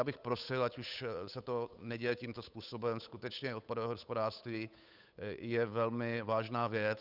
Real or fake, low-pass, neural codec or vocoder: fake; 5.4 kHz; codec, 16 kHz, 8 kbps, FunCodec, trained on Chinese and English, 25 frames a second